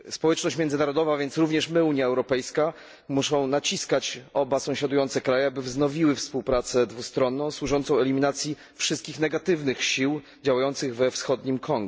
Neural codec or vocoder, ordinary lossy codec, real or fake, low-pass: none; none; real; none